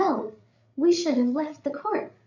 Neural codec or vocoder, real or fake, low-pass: codec, 16 kHz, 8 kbps, FreqCodec, larger model; fake; 7.2 kHz